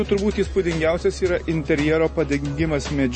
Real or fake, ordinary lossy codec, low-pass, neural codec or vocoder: real; MP3, 32 kbps; 9.9 kHz; none